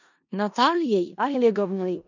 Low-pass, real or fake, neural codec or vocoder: 7.2 kHz; fake; codec, 16 kHz in and 24 kHz out, 0.4 kbps, LongCat-Audio-Codec, four codebook decoder